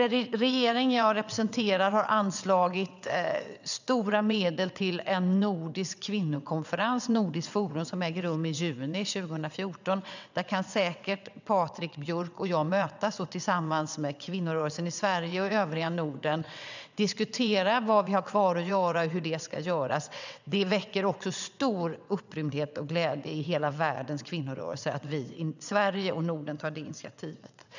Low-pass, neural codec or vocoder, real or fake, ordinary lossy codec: 7.2 kHz; vocoder, 22.05 kHz, 80 mel bands, WaveNeXt; fake; none